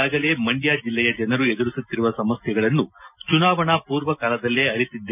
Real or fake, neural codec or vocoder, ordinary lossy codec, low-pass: real; none; none; 3.6 kHz